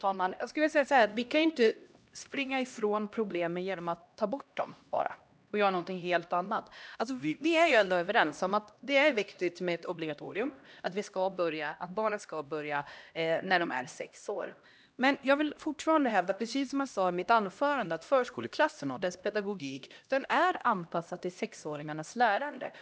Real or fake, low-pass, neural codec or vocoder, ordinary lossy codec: fake; none; codec, 16 kHz, 1 kbps, X-Codec, HuBERT features, trained on LibriSpeech; none